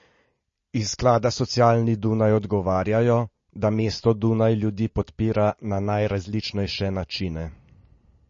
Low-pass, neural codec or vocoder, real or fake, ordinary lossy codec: 7.2 kHz; none; real; MP3, 32 kbps